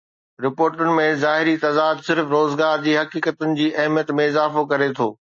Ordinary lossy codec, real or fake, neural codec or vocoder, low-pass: MP3, 32 kbps; real; none; 9.9 kHz